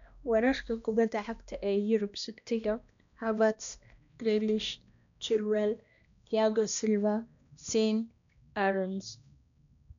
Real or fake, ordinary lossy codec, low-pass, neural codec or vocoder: fake; none; 7.2 kHz; codec, 16 kHz, 1 kbps, X-Codec, HuBERT features, trained on balanced general audio